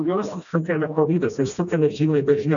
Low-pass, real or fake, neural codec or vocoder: 7.2 kHz; fake; codec, 16 kHz, 1 kbps, FreqCodec, smaller model